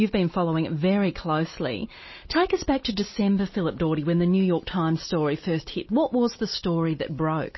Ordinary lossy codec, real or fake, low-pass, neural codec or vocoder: MP3, 24 kbps; real; 7.2 kHz; none